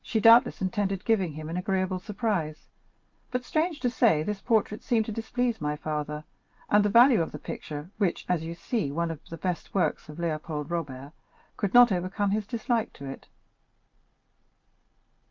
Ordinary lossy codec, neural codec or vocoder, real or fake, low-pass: Opus, 24 kbps; none; real; 7.2 kHz